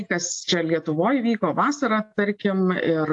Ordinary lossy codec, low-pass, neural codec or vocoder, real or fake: AAC, 64 kbps; 10.8 kHz; none; real